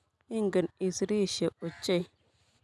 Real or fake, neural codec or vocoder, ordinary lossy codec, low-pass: real; none; none; none